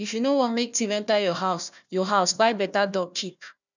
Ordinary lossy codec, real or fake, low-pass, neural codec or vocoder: none; fake; 7.2 kHz; codec, 16 kHz, 1 kbps, FunCodec, trained on Chinese and English, 50 frames a second